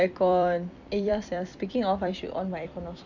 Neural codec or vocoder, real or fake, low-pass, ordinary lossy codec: vocoder, 44.1 kHz, 128 mel bands every 256 samples, BigVGAN v2; fake; 7.2 kHz; none